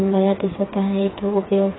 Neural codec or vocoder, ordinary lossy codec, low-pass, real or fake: codec, 16 kHz, 4 kbps, FreqCodec, smaller model; AAC, 16 kbps; 7.2 kHz; fake